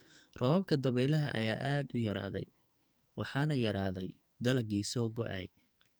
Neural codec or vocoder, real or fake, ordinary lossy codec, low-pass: codec, 44.1 kHz, 2.6 kbps, SNAC; fake; none; none